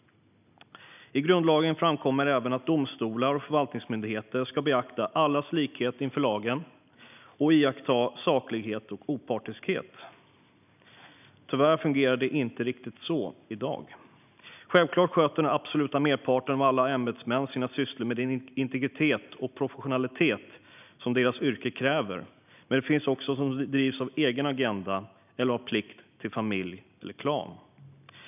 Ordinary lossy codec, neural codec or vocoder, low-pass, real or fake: none; none; 3.6 kHz; real